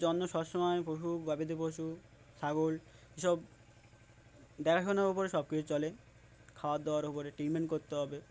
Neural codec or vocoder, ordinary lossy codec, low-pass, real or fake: none; none; none; real